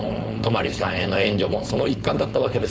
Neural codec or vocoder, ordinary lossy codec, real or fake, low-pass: codec, 16 kHz, 4.8 kbps, FACodec; none; fake; none